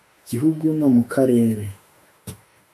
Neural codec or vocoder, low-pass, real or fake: autoencoder, 48 kHz, 32 numbers a frame, DAC-VAE, trained on Japanese speech; 14.4 kHz; fake